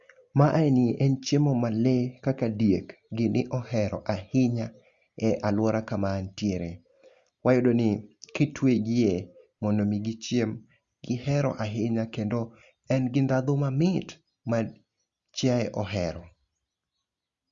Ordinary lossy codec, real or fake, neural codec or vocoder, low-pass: Opus, 64 kbps; real; none; 7.2 kHz